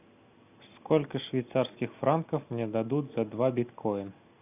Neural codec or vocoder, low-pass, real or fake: none; 3.6 kHz; real